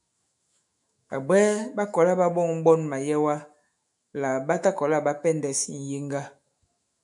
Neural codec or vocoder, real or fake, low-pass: autoencoder, 48 kHz, 128 numbers a frame, DAC-VAE, trained on Japanese speech; fake; 10.8 kHz